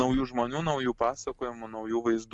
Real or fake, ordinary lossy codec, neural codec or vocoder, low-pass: real; MP3, 64 kbps; none; 10.8 kHz